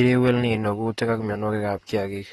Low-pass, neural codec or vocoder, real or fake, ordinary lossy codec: 19.8 kHz; vocoder, 44.1 kHz, 128 mel bands, Pupu-Vocoder; fake; AAC, 32 kbps